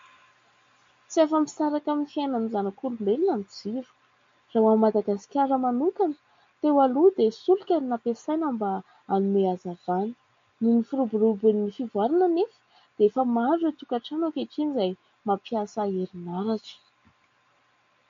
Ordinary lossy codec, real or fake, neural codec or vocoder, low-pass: MP3, 48 kbps; real; none; 7.2 kHz